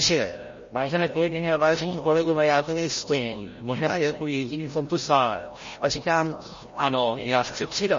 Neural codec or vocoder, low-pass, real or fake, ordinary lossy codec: codec, 16 kHz, 0.5 kbps, FreqCodec, larger model; 7.2 kHz; fake; MP3, 32 kbps